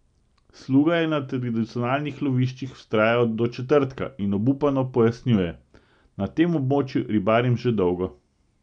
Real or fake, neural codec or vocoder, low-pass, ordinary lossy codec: real; none; 9.9 kHz; none